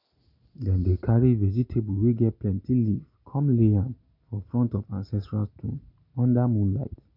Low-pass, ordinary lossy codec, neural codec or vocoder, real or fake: 5.4 kHz; none; none; real